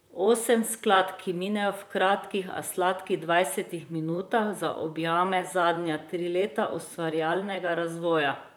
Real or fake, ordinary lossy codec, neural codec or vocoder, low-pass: fake; none; vocoder, 44.1 kHz, 128 mel bands, Pupu-Vocoder; none